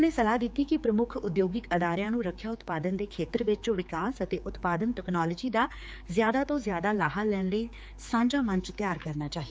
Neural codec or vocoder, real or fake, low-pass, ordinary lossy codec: codec, 16 kHz, 4 kbps, X-Codec, HuBERT features, trained on general audio; fake; none; none